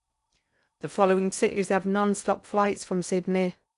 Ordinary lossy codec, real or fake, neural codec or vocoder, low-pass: none; fake; codec, 16 kHz in and 24 kHz out, 0.6 kbps, FocalCodec, streaming, 4096 codes; 10.8 kHz